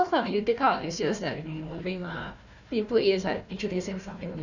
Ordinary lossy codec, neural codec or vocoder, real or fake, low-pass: none; codec, 16 kHz, 1 kbps, FunCodec, trained on Chinese and English, 50 frames a second; fake; 7.2 kHz